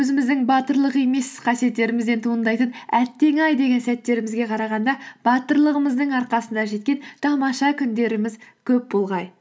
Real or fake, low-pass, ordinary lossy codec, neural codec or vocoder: real; none; none; none